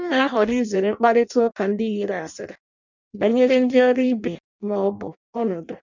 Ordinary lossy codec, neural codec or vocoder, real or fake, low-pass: none; codec, 16 kHz in and 24 kHz out, 0.6 kbps, FireRedTTS-2 codec; fake; 7.2 kHz